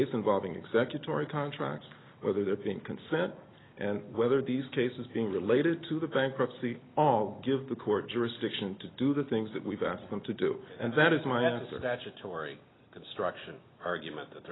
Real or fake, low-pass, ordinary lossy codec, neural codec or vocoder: real; 7.2 kHz; AAC, 16 kbps; none